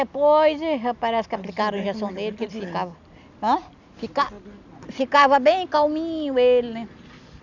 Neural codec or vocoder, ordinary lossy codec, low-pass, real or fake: none; none; 7.2 kHz; real